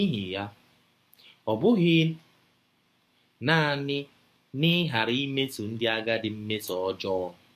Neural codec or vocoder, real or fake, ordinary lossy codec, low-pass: codec, 44.1 kHz, 7.8 kbps, DAC; fake; MP3, 64 kbps; 14.4 kHz